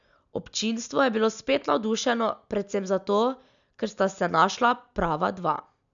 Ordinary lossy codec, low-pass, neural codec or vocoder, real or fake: none; 7.2 kHz; none; real